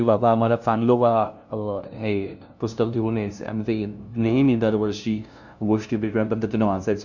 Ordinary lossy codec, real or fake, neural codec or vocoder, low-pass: none; fake; codec, 16 kHz, 0.5 kbps, FunCodec, trained on LibriTTS, 25 frames a second; 7.2 kHz